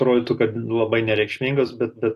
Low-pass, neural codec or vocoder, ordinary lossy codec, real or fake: 14.4 kHz; none; AAC, 48 kbps; real